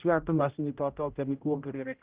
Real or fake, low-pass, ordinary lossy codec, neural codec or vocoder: fake; 3.6 kHz; Opus, 16 kbps; codec, 16 kHz, 0.5 kbps, X-Codec, HuBERT features, trained on general audio